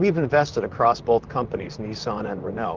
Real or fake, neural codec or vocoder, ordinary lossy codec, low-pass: fake; vocoder, 44.1 kHz, 128 mel bands, Pupu-Vocoder; Opus, 32 kbps; 7.2 kHz